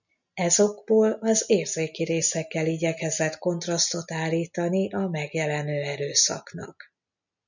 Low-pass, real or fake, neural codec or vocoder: 7.2 kHz; real; none